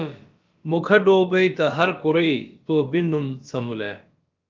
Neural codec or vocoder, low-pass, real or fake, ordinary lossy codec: codec, 16 kHz, about 1 kbps, DyCAST, with the encoder's durations; 7.2 kHz; fake; Opus, 24 kbps